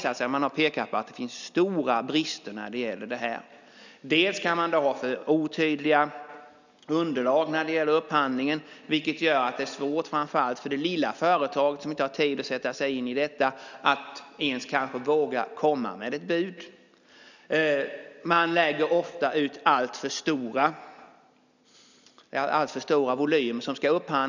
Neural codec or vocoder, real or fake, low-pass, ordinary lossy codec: none; real; 7.2 kHz; none